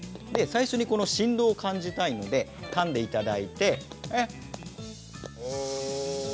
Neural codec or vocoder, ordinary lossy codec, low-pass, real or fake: none; none; none; real